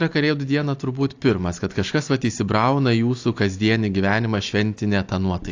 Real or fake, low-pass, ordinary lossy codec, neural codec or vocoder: real; 7.2 kHz; AAC, 48 kbps; none